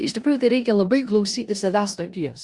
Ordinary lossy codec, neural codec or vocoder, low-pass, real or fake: Opus, 64 kbps; codec, 16 kHz in and 24 kHz out, 0.9 kbps, LongCat-Audio-Codec, four codebook decoder; 10.8 kHz; fake